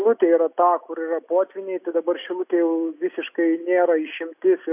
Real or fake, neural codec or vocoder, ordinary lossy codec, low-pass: real; none; AAC, 32 kbps; 3.6 kHz